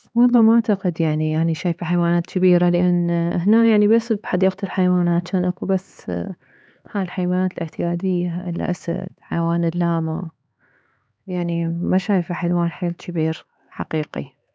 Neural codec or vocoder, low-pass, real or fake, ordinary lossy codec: codec, 16 kHz, 4 kbps, X-Codec, HuBERT features, trained on LibriSpeech; none; fake; none